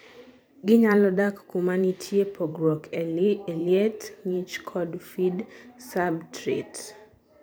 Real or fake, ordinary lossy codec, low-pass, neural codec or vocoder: fake; none; none; vocoder, 44.1 kHz, 128 mel bands every 512 samples, BigVGAN v2